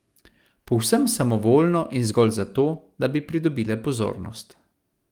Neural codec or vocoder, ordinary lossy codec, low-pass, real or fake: codec, 44.1 kHz, 7.8 kbps, DAC; Opus, 24 kbps; 19.8 kHz; fake